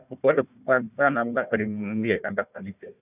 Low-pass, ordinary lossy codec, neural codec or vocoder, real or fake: 3.6 kHz; none; codec, 16 kHz, 1 kbps, FunCodec, trained on Chinese and English, 50 frames a second; fake